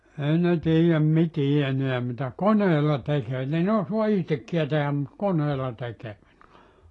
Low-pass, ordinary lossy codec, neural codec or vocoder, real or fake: 10.8 kHz; AAC, 32 kbps; none; real